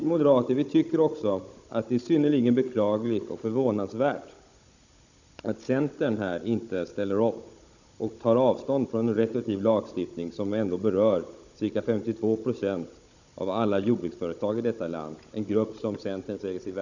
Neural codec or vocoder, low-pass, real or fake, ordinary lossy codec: codec, 16 kHz, 16 kbps, FunCodec, trained on Chinese and English, 50 frames a second; 7.2 kHz; fake; none